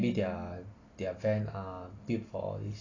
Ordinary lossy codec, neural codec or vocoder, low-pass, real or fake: AAC, 32 kbps; none; 7.2 kHz; real